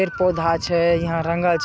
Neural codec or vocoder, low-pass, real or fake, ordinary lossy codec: none; none; real; none